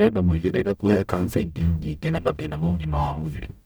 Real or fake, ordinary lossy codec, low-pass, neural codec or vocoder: fake; none; none; codec, 44.1 kHz, 0.9 kbps, DAC